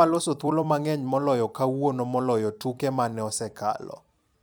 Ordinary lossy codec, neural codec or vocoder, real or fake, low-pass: none; vocoder, 44.1 kHz, 128 mel bands every 256 samples, BigVGAN v2; fake; none